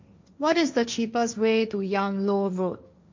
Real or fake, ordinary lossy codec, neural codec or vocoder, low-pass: fake; MP3, 64 kbps; codec, 16 kHz, 1.1 kbps, Voila-Tokenizer; 7.2 kHz